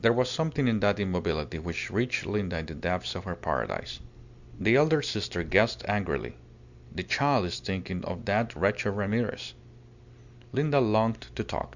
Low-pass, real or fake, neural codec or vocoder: 7.2 kHz; real; none